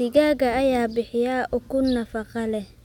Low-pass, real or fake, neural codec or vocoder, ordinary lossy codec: 19.8 kHz; real; none; none